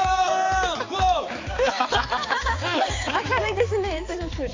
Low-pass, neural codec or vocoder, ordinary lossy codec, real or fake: 7.2 kHz; codec, 16 kHz, 4 kbps, X-Codec, HuBERT features, trained on balanced general audio; AAC, 32 kbps; fake